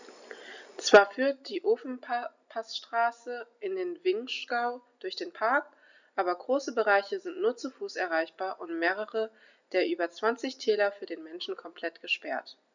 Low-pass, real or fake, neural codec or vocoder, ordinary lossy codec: 7.2 kHz; real; none; none